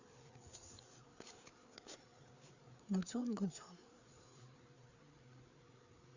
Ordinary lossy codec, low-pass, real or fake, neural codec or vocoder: Opus, 64 kbps; 7.2 kHz; fake; codec, 16 kHz, 4 kbps, FreqCodec, larger model